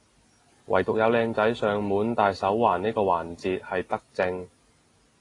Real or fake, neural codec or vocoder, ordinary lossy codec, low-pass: real; none; AAC, 32 kbps; 10.8 kHz